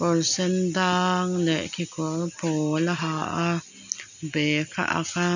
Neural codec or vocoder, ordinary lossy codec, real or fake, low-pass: none; none; real; 7.2 kHz